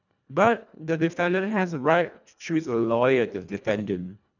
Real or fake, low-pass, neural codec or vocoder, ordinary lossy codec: fake; 7.2 kHz; codec, 24 kHz, 1.5 kbps, HILCodec; none